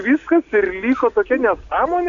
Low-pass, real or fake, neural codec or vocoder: 7.2 kHz; real; none